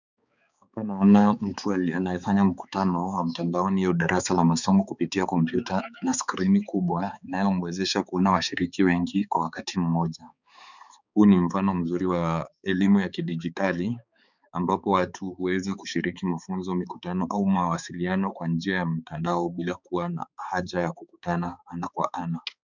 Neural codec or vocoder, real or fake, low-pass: codec, 16 kHz, 4 kbps, X-Codec, HuBERT features, trained on balanced general audio; fake; 7.2 kHz